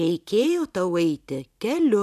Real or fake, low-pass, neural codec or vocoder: fake; 14.4 kHz; vocoder, 44.1 kHz, 128 mel bands every 512 samples, BigVGAN v2